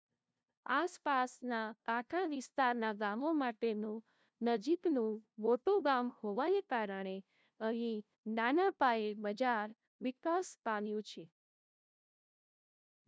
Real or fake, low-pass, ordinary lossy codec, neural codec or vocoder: fake; none; none; codec, 16 kHz, 0.5 kbps, FunCodec, trained on LibriTTS, 25 frames a second